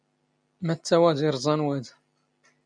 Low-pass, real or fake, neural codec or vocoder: 9.9 kHz; real; none